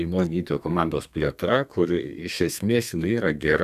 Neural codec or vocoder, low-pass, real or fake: codec, 32 kHz, 1.9 kbps, SNAC; 14.4 kHz; fake